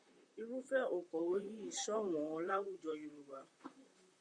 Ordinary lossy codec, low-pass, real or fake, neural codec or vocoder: Opus, 64 kbps; 9.9 kHz; fake; vocoder, 44.1 kHz, 128 mel bands, Pupu-Vocoder